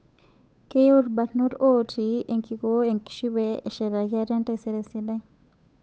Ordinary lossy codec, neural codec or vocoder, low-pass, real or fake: none; codec, 16 kHz, 8 kbps, FunCodec, trained on Chinese and English, 25 frames a second; none; fake